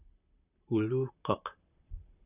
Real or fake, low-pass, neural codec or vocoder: fake; 3.6 kHz; vocoder, 44.1 kHz, 128 mel bands, Pupu-Vocoder